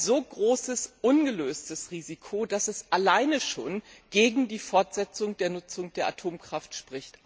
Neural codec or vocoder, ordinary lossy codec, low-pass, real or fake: none; none; none; real